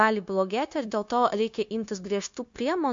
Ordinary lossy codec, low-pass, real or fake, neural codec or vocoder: MP3, 48 kbps; 7.2 kHz; fake; codec, 16 kHz, 0.9 kbps, LongCat-Audio-Codec